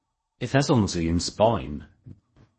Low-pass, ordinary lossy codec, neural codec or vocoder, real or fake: 10.8 kHz; MP3, 32 kbps; codec, 16 kHz in and 24 kHz out, 0.8 kbps, FocalCodec, streaming, 65536 codes; fake